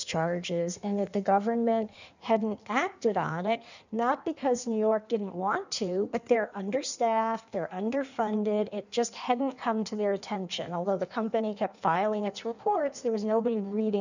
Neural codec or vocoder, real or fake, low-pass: codec, 16 kHz in and 24 kHz out, 1.1 kbps, FireRedTTS-2 codec; fake; 7.2 kHz